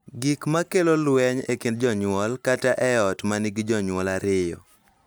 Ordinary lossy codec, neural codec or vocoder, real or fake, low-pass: none; none; real; none